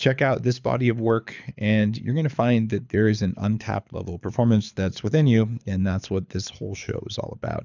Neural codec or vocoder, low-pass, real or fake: codec, 24 kHz, 6 kbps, HILCodec; 7.2 kHz; fake